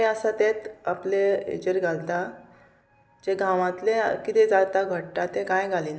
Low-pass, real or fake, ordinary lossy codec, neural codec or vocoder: none; real; none; none